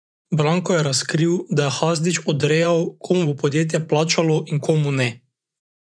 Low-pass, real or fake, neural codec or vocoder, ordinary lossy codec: 9.9 kHz; fake; vocoder, 24 kHz, 100 mel bands, Vocos; none